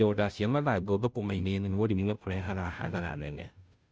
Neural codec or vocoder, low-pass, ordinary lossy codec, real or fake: codec, 16 kHz, 0.5 kbps, FunCodec, trained on Chinese and English, 25 frames a second; none; none; fake